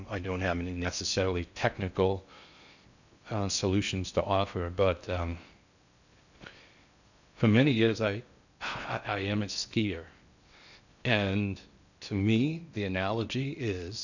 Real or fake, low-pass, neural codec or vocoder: fake; 7.2 kHz; codec, 16 kHz in and 24 kHz out, 0.6 kbps, FocalCodec, streaming, 4096 codes